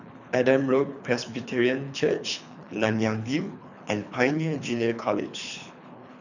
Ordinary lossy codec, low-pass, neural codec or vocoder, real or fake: none; 7.2 kHz; codec, 24 kHz, 3 kbps, HILCodec; fake